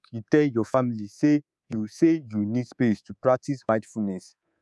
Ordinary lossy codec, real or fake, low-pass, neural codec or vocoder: none; fake; none; codec, 24 kHz, 3.1 kbps, DualCodec